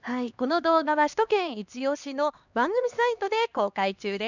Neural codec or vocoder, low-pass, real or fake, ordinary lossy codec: codec, 16 kHz, 2 kbps, X-Codec, HuBERT features, trained on LibriSpeech; 7.2 kHz; fake; none